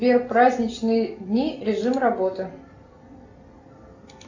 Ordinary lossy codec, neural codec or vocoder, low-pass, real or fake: AAC, 48 kbps; none; 7.2 kHz; real